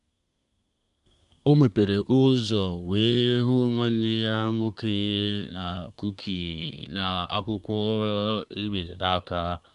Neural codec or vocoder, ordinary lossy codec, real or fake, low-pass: codec, 24 kHz, 1 kbps, SNAC; none; fake; 10.8 kHz